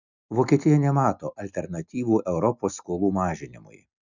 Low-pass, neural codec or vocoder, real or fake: 7.2 kHz; none; real